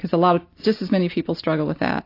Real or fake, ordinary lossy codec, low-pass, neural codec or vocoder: real; AAC, 32 kbps; 5.4 kHz; none